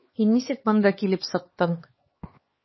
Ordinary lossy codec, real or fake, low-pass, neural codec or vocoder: MP3, 24 kbps; fake; 7.2 kHz; codec, 16 kHz, 2 kbps, X-Codec, HuBERT features, trained on LibriSpeech